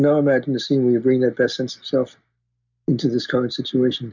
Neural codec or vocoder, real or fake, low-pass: none; real; 7.2 kHz